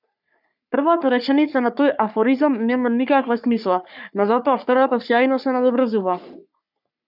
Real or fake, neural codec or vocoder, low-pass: fake; codec, 44.1 kHz, 3.4 kbps, Pupu-Codec; 5.4 kHz